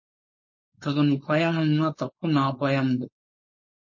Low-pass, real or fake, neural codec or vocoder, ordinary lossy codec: 7.2 kHz; fake; codec, 16 kHz, 4.8 kbps, FACodec; MP3, 32 kbps